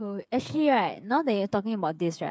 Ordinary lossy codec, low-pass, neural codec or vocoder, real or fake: none; none; codec, 16 kHz, 8 kbps, FreqCodec, smaller model; fake